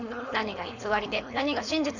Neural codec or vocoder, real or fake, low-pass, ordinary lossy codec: codec, 16 kHz, 4.8 kbps, FACodec; fake; 7.2 kHz; none